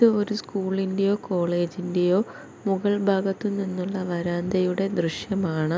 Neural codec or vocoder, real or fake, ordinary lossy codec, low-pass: none; real; none; none